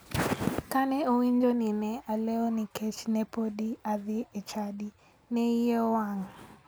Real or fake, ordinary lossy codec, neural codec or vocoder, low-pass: real; none; none; none